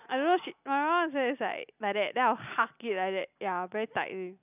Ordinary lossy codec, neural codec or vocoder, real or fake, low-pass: none; none; real; 3.6 kHz